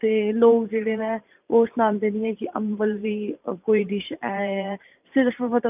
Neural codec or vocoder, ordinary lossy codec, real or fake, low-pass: vocoder, 44.1 kHz, 128 mel bands every 512 samples, BigVGAN v2; none; fake; 3.6 kHz